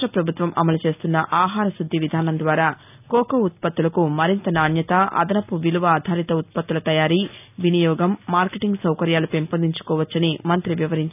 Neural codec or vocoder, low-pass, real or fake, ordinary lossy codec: none; 3.6 kHz; real; none